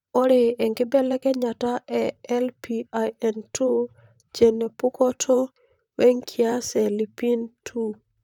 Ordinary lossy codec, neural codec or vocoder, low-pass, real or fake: none; vocoder, 44.1 kHz, 128 mel bands, Pupu-Vocoder; 19.8 kHz; fake